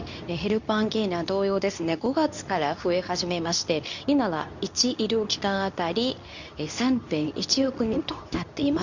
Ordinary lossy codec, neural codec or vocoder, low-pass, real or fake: none; codec, 24 kHz, 0.9 kbps, WavTokenizer, medium speech release version 2; 7.2 kHz; fake